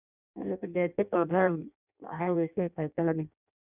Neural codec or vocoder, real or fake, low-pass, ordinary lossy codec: codec, 16 kHz in and 24 kHz out, 0.6 kbps, FireRedTTS-2 codec; fake; 3.6 kHz; none